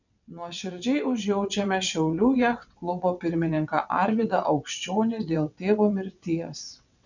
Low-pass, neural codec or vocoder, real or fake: 7.2 kHz; vocoder, 22.05 kHz, 80 mel bands, WaveNeXt; fake